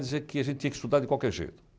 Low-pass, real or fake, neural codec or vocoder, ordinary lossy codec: none; real; none; none